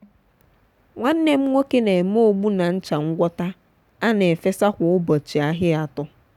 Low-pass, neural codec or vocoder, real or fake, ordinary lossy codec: 19.8 kHz; none; real; none